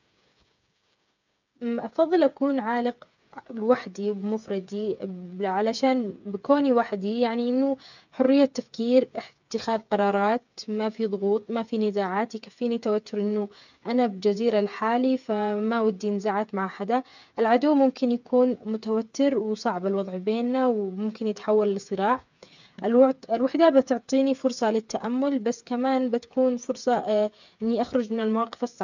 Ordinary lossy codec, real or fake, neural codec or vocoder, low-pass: none; fake; codec, 16 kHz, 8 kbps, FreqCodec, smaller model; 7.2 kHz